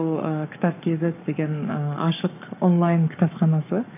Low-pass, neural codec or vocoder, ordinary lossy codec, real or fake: 3.6 kHz; codec, 44.1 kHz, 7.8 kbps, Pupu-Codec; none; fake